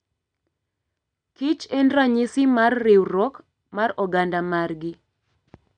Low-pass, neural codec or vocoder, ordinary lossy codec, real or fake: 9.9 kHz; none; none; real